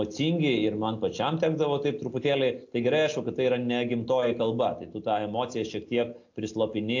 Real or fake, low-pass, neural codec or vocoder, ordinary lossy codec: real; 7.2 kHz; none; AAC, 48 kbps